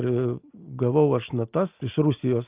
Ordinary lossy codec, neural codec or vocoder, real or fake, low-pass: Opus, 24 kbps; none; real; 3.6 kHz